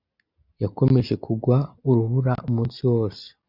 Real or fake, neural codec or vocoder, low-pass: real; none; 5.4 kHz